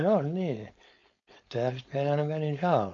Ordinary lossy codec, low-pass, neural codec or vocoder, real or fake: MP3, 48 kbps; 7.2 kHz; codec, 16 kHz, 4.8 kbps, FACodec; fake